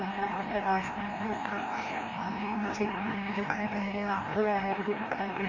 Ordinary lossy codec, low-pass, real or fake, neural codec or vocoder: MP3, 48 kbps; 7.2 kHz; fake; codec, 16 kHz, 1 kbps, FreqCodec, larger model